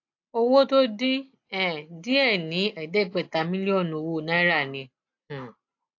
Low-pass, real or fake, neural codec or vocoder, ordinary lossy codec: 7.2 kHz; real; none; AAC, 48 kbps